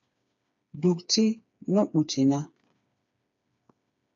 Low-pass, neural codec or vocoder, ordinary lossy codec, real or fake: 7.2 kHz; codec, 16 kHz, 4 kbps, FreqCodec, smaller model; AAC, 64 kbps; fake